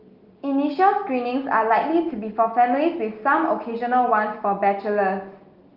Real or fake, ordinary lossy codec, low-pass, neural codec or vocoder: real; Opus, 32 kbps; 5.4 kHz; none